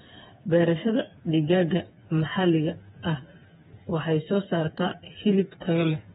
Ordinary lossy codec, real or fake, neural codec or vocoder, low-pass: AAC, 16 kbps; fake; codec, 16 kHz, 4 kbps, FreqCodec, smaller model; 7.2 kHz